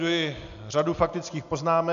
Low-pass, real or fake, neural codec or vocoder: 7.2 kHz; real; none